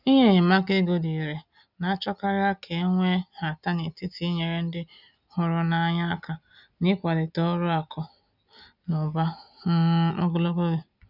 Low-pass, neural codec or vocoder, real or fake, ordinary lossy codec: 5.4 kHz; none; real; none